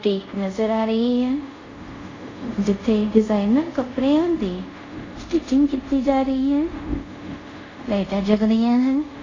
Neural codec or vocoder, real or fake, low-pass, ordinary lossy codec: codec, 24 kHz, 0.5 kbps, DualCodec; fake; 7.2 kHz; AAC, 32 kbps